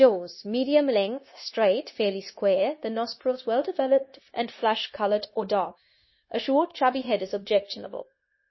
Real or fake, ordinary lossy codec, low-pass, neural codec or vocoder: fake; MP3, 24 kbps; 7.2 kHz; codec, 16 kHz, 0.9 kbps, LongCat-Audio-Codec